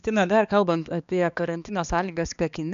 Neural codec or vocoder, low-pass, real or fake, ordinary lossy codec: codec, 16 kHz, 2 kbps, X-Codec, HuBERT features, trained on balanced general audio; 7.2 kHz; fake; MP3, 96 kbps